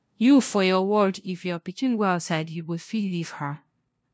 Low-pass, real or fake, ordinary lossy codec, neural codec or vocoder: none; fake; none; codec, 16 kHz, 0.5 kbps, FunCodec, trained on LibriTTS, 25 frames a second